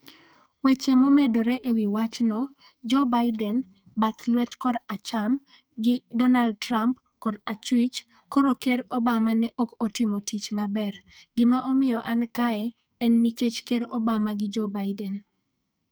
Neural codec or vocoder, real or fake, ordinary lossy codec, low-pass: codec, 44.1 kHz, 2.6 kbps, SNAC; fake; none; none